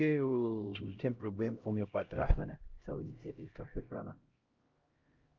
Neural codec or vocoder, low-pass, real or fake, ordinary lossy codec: codec, 16 kHz, 0.5 kbps, X-Codec, HuBERT features, trained on LibriSpeech; 7.2 kHz; fake; Opus, 32 kbps